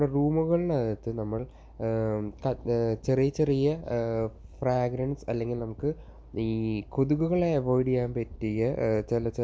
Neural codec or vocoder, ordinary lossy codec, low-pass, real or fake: none; none; none; real